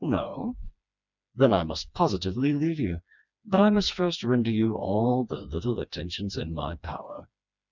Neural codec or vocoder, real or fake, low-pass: codec, 16 kHz, 2 kbps, FreqCodec, smaller model; fake; 7.2 kHz